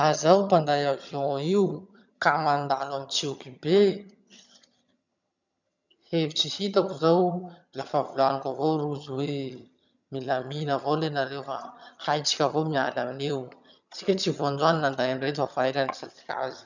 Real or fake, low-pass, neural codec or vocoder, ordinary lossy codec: fake; 7.2 kHz; vocoder, 22.05 kHz, 80 mel bands, HiFi-GAN; none